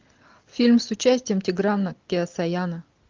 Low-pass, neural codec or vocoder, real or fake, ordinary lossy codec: 7.2 kHz; none; real; Opus, 24 kbps